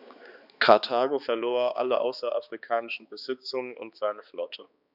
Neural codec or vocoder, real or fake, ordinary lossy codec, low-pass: codec, 16 kHz, 2 kbps, X-Codec, HuBERT features, trained on balanced general audio; fake; none; 5.4 kHz